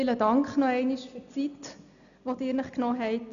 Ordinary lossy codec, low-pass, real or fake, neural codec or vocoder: none; 7.2 kHz; real; none